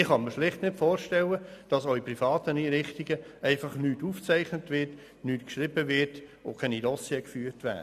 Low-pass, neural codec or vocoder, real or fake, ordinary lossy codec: 14.4 kHz; none; real; none